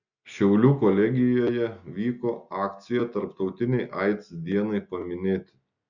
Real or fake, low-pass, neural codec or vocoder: real; 7.2 kHz; none